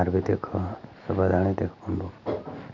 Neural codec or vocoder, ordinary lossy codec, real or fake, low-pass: none; MP3, 64 kbps; real; 7.2 kHz